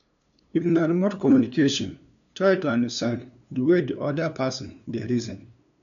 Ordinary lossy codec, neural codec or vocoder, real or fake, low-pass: none; codec, 16 kHz, 2 kbps, FunCodec, trained on LibriTTS, 25 frames a second; fake; 7.2 kHz